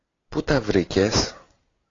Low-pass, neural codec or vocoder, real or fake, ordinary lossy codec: 7.2 kHz; none; real; AAC, 32 kbps